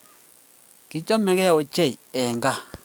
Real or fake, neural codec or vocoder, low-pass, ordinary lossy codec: fake; codec, 44.1 kHz, 7.8 kbps, DAC; none; none